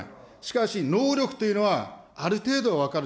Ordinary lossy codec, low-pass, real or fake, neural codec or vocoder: none; none; real; none